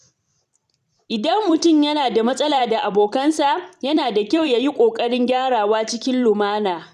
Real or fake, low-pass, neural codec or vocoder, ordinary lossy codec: fake; 14.4 kHz; vocoder, 44.1 kHz, 128 mel bands every 256 samples, BigVGAN v2; none